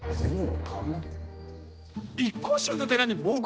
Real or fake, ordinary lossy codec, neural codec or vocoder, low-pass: fake; none; codec, 16 kHz, 1 kbps, X-Codec, HuBERT features, trained on general audio; none